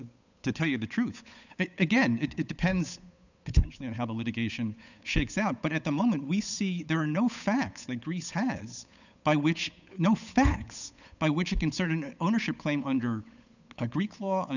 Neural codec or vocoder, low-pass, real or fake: codec, 16 kHz, 8 kbps, FunCodec, trained on Chinese and English, 25 frames a second; 7.2 kHz; fake